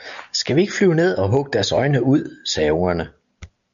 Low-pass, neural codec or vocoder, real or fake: 7.2 kHz; none; real